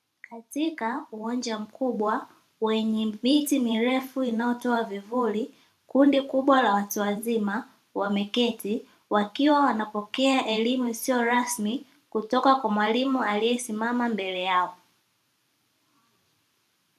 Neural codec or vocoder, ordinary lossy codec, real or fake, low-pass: vocoder, 44.1 kHz, 128 mel bands every 512 samples, BigVGAN v2; MP3, 96 kbps; fake; 14.4 kHz